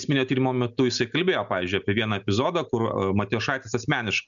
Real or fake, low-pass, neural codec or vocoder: real; 7.2 kHz; none